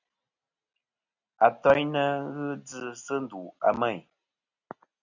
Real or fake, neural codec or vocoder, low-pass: real; none; 7.2 kHz